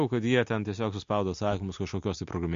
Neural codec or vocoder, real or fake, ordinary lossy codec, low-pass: none; real; MP3, 48 kbps; 7.2 kHz